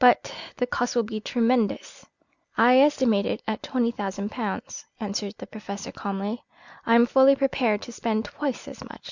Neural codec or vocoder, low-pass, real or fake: none; 7.2 kHz; real